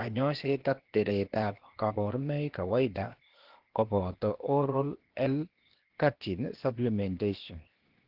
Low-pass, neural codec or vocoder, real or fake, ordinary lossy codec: 5.4 kHz; codec, 16 kHz, 0.8 kbps, ZipCodec; fake; Opus, 16 kbps